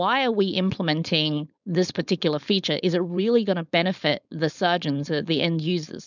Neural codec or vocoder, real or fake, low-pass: codec, 16 kHz, 4.8 kbps, FACodec; fake; 7.2 kHz